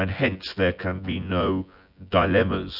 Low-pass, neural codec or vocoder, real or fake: 5.4 kHz; vocoder, 24 kHz, 100 mel bands, Vocos; fake